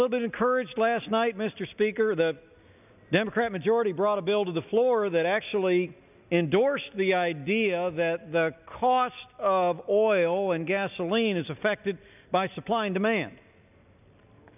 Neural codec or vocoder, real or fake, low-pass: none; real; 3.6 kHz